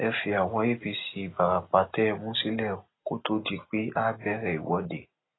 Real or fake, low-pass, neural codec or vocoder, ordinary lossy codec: real; 7.2 kHz; none; AAC, 16 kbps